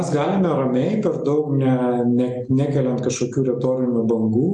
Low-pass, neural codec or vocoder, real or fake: 10.8 kHz; none; real